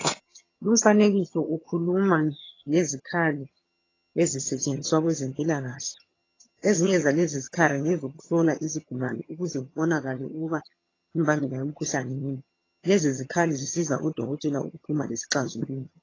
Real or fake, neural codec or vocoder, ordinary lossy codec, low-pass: fake; vocoder, 22.05 kHz, 80 mel bands, HiFi-GAN; AAC, 32 kbps; 7.2 kHz